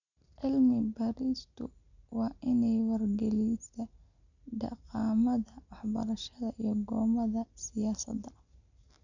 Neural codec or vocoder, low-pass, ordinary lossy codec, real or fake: none; 7.2 kHz; none; real